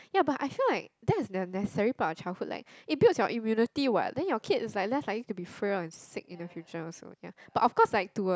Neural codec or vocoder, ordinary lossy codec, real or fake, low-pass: none; none; real; none